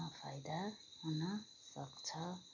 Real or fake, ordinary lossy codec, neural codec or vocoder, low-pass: real; none; none; 7.2 kHz